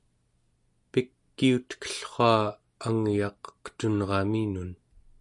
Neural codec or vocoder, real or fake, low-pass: none; real; 10.8 kHz